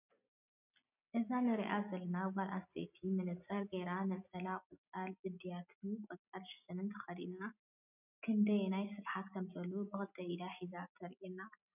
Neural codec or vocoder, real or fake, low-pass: none; real; 3.6 kHz